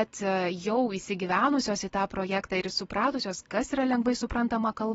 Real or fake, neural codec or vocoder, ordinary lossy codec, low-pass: real; none; AAC, 24 kbps; 19.8 kHz